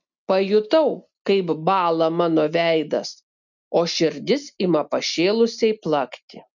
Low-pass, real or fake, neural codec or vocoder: 7.2 kHz; real; none